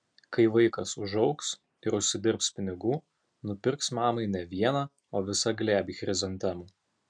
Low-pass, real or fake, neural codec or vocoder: 9.9 kHz; real; none